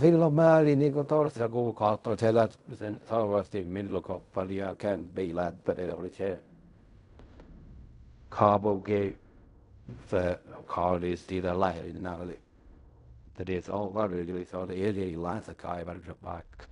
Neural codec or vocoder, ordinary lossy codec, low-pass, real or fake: codec, 16 kHz in and 24 kHz out, 0.4 kbps, LongCat-Audio-Codec, fine tuned four codebook decoder; none; 10.8 kHz; fake